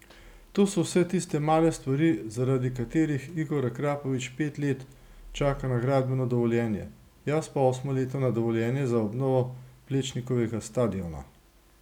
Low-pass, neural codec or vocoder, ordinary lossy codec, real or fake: 19.8 kHz; none; none; real